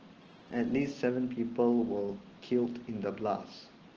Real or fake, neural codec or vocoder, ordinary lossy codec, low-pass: real; none; Opus, 16 kbps; 7.2 kHz